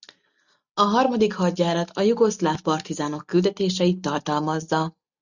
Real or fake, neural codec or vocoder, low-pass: real; none; 7.2 kHz